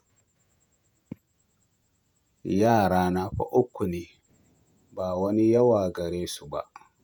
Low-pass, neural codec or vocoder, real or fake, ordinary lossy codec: none; vocoder, 48 kHz, 128 mel bands, Vocos; fake; none